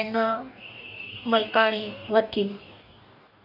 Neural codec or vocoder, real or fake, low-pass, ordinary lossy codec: codec, 44.1 kHz, 2.6 kbps, DAC; fake; 5.4 kHz; none